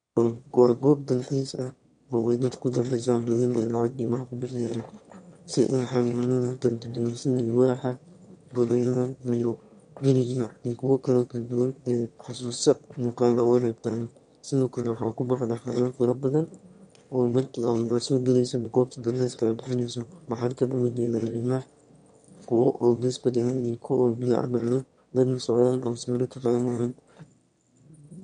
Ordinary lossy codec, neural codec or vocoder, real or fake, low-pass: MP3, 64 kbps; autoencoder, 22.05 kHz, a latent of 192 numbers a frame, VITS, trained on one speaker; fake; 9.9 kHz